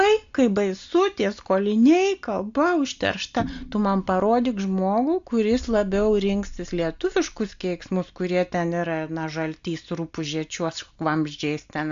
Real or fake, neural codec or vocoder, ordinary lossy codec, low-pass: real; none; AAC, 64 kbps; 7.2 kHz